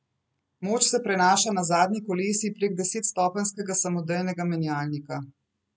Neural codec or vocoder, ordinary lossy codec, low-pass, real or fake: none; none; none; real